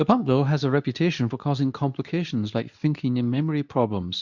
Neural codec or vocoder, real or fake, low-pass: codec, 24 kHz, 0.9 kbps, WavTokenizer, medium speech release version 2; fake; 7.2 kHz